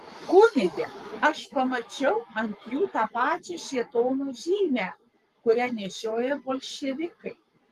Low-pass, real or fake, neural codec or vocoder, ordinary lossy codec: 14.4 kHz; fake; codec, 44.1 kHz, 7.8 kbps, Pupu-Codec; Opus, 32 kbps